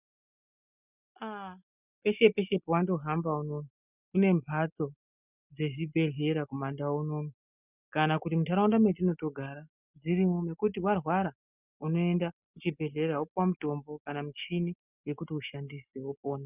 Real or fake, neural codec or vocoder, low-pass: real; none; 3.6 kHz